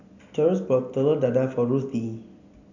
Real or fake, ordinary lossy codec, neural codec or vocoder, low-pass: real; none; none; 7.2 kHz